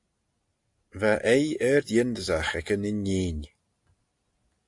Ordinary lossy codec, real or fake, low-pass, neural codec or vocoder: AAC, 64 kbps; real; 10.8 kHz; none